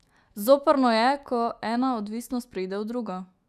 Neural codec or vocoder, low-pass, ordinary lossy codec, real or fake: autoencoder, 48 kHz, 128 numbers a frame, DAC-VAE, trained on Japanese speech; 14.4 kHz; Opus, 64 kbps; fake